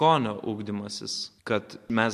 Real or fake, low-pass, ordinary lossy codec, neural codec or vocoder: real; 14.4 kHz; MP3, 64 kbps; none